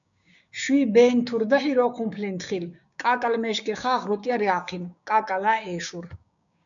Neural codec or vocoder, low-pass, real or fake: codec, 16 kHz, 6 kbps, DAC; 7.2 kHz; fake